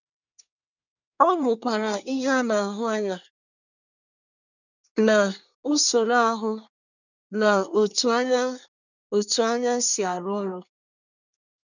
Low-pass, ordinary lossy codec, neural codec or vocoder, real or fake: 7.2 kHz; none; codec, 24 kHz, 1 kbps, SNAC; fake